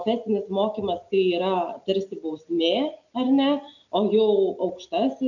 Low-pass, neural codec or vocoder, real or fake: 7.2 kHz; none; real